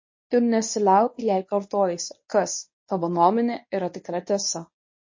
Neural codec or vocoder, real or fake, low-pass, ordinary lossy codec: codec, 24 kHz, 0.9 kbps, WavTokenizer, medium speech release version 1; fake; 7.2 kHz; MP3, 32 kbps